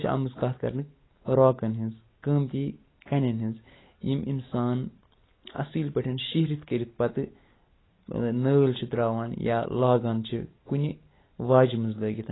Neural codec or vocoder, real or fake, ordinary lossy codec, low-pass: none; real; AAC, 16 kbps; 7.2 kHz